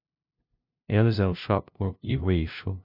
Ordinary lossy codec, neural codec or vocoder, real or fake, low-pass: MP3, 48 kbps; codec, 16 kHz, 0.5 kbps, FunCodec, trained on LibriTTS, 25 frames a second; fake; 5.4 kHz